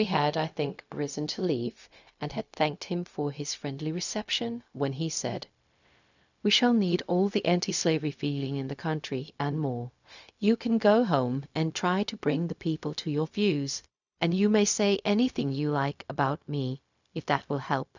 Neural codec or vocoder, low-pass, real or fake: codec, 16 kHz, 0.4 kbps, LongCat-Audio-Codec; 7.2 kHz; fake